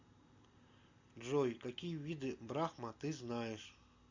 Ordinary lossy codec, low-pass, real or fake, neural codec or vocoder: MP3, 64 kbps; 7.2 kHz; real; none